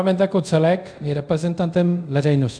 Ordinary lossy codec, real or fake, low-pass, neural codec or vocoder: MP3, 96 kbps; fake; 9.9 kHz; codec, 24 kHz, 0.5 kbps, DualCodec